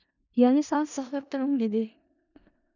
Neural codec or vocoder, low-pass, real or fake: codec, 16 kHz in and 24 kHz out, 0.4 kbps, LongCat-Audio-Codec, four codebook decoder; 7.2 kHz; fake